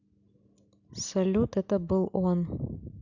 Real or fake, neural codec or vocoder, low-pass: real; none; 7.2 kHz